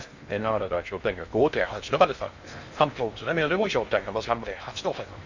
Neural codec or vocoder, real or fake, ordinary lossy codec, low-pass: codec, 16 kHz in and 24 kHz out, 0.6 kbps, FocalCodec, streaming, 2048 codes; fake; Opus, 64 kbps; 7.2 kHz